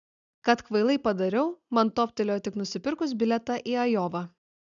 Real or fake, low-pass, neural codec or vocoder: real; 7.2 kHz; none